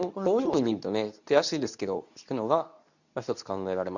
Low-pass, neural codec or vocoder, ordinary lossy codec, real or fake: 7.2 kHz; codec, 24 kHz, 0.9 kbps, WavTokenizer, medium speech release version 2; none; fake